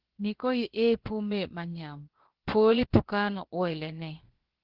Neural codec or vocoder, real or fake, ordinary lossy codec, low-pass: codec, 16 kHz, about 1 kbps, DyCAST, with the encoder's durations; fake; Opus, 16 kbps; 5.4 kHz